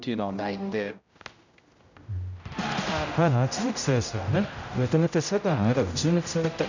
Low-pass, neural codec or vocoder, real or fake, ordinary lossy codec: 7.2 kHz; codec, 16 kHz, 0.5 kbps, X-Codec, HuBERT features, trained on general audio; fake; none